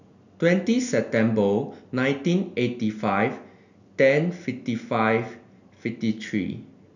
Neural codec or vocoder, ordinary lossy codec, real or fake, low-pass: none; none; real; 7.2 kHz